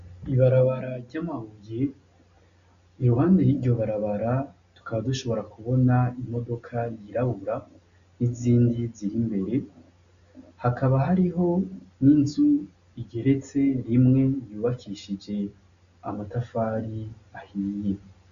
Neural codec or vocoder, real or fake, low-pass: none; real; 7.2 kHz